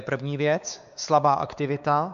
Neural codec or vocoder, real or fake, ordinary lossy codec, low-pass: codec, 16 kHz, 4 kbps, X-Codec, WavLM features, trained on Multilingual LibriSpeech; fake; AAC, 96 kbps; 7.2 kHz